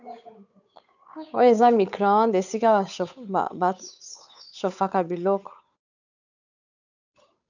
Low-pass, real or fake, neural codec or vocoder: 7.2 kHz; fake; codec, 16 kHz, 8 kbps, FunCodec, trained on Chinese and English, 25 frames a second